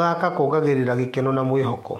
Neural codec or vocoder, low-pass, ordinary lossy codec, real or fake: autoencoder, 48 kHz, 128 numbers a frame, DAC-VAE, trained on Japanese speech; 19.8 kHz; MP3, 64 kbps; fake